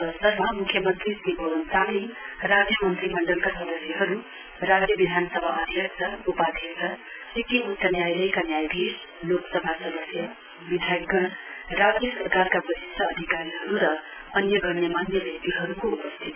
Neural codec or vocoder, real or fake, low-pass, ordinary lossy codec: none; real; 3.6 kHz; none